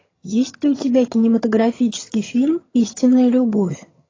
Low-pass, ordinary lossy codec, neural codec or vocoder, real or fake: 7.2 kHz; AAC, 32 kbps; vocoder, 22.05 kHz, 80 mel bands, HiFi-GAN; fake